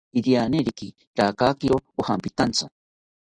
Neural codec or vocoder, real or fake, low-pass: none; real; 9.9 kHz